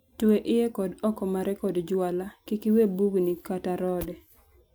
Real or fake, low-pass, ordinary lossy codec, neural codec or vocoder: real; none; none; none